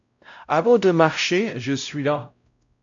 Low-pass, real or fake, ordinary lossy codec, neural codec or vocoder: 7.2 kHz; fake; AAC, 64 kbps; codec, 16 kHz, 0.5 kbps, X-Codec, WavLM features, trained on Multilingual LibriSpeech